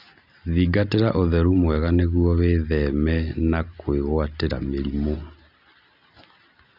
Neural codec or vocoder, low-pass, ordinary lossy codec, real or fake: none; 5.4 kHz; none; real